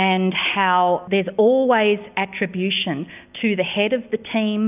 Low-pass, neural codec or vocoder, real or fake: 3.6 kHz; none; real